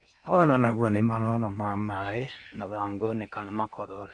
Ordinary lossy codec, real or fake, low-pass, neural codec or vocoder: AAC, 64 kbps; fake; 9.9 kHz; codec, 16 kHz in and 24 kHz out, 0.8 kbps, FocalCodec, streaming, 65536 codes